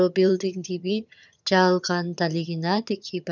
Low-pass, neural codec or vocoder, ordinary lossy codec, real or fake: 7.2 kHz; vocoder, 22.05 kHz, 80 mel bands, HiFi-GAN; none; fake